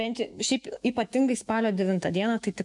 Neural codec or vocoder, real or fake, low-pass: autoencoder, 48 kHz, 128 numbers a frame, DAC-VAE, trained on Japanese speech; fake; 10.8 kHz